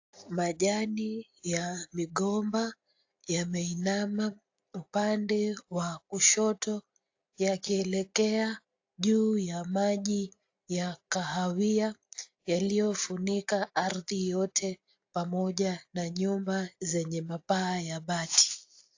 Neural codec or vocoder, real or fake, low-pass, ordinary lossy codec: none; real; 7.2 kHz; AAC, 48 kbps